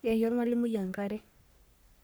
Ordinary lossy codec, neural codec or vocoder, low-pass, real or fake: none; codec, 44.1 kHz, 3.4 kbps, Pupu-Codec; none; fake